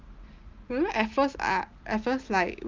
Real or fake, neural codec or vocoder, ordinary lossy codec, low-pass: real; none; Opus, 24 kbps; 7.2 kHz